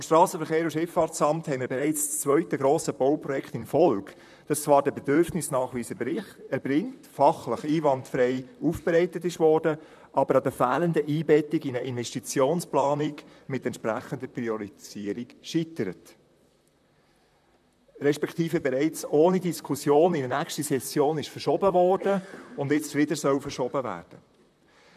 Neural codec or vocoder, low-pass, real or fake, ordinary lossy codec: vocoder, 44.1 kHz, 128 mel bands, Pupu-Vocoder; 14.4 kHz; fake; MP3, 96 kbps